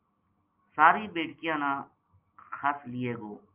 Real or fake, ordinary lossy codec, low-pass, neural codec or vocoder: real; Opus, 24 kbps; 3.6 kHz; none